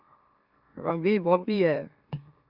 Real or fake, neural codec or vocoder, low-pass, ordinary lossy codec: fake; autoencoder, 44.1 kHz, a latent of 192 numbers a frame, MeloTTS; 5.4 kHz; Opus, 64 kbps